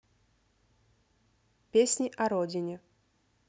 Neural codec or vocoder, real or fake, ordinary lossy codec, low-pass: none; real; none; none